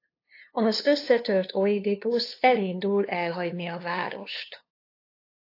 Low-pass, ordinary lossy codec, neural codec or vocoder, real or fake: 5.4 kHz; AAC, 32 kbps; codec, 16 kHz, 2 kbps, FunCodec, trained on LibriTTS, 25 frames a second; fake